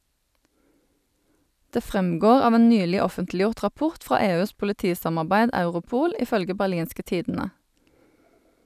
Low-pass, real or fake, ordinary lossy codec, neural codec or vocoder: 14.4 kHz; real; none; none